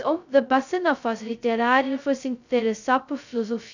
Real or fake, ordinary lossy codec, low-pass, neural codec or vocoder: fake; none; 7.2 kHz; codec, 16 kHz, 0.2 kbps, FocalCodec